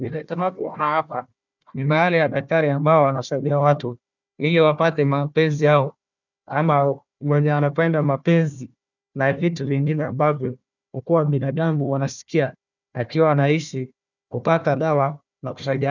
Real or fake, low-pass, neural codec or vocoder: fake; 7.2 kHz; codec, 16 kHz, 1 kbps, FunCodec, trained on Chinese and English, 50 frames a second